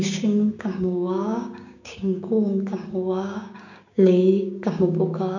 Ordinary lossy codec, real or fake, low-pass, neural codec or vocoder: none; fake; 7.2 kHz; codec, 16 kHz, 6 kbps, DAC